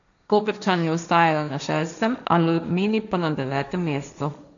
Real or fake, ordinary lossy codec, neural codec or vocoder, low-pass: fake; none; codec, 16 kHz, 1.1 kbps, Voila-Tokenizer; 7.2 kHz